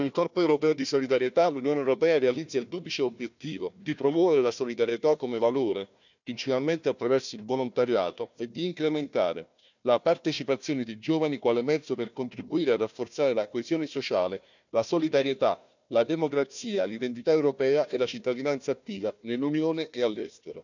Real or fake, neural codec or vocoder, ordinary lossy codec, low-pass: fake; codec, 16 kHz, 1 kbps, FunCodec, trained on Chinese and English, 50 frames a second; none; 7.2 kHz